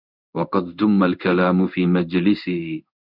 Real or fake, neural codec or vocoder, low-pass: fake; codec, 16 kHz in and 24 kHz out, 1 kbps, XY-Tokenizer; 5.4 kHz